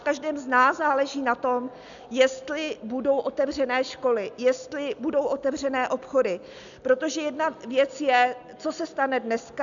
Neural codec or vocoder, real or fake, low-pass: none; real; 7.2 kHz